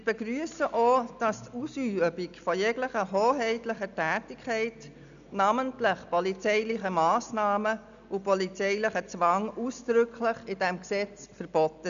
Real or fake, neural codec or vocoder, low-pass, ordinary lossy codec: real; none; 7.2 kHz; none